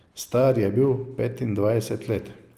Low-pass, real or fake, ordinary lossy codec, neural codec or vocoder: 14.4 kHz; real; Opus, 32 kbps; none